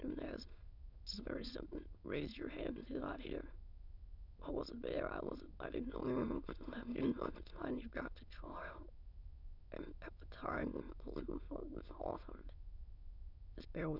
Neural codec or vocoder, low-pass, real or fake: autoencoder, 22.05 kHz, a latent of 192 numbers a frame, VITS, trained on many speakers; 5.4 kHz; fake